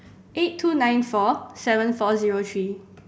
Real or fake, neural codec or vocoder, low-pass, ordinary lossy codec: real; none; none; none